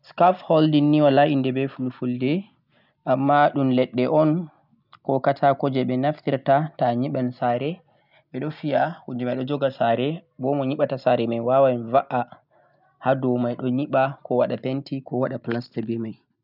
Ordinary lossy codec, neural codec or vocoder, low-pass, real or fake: none; none; 5.4 kHz; real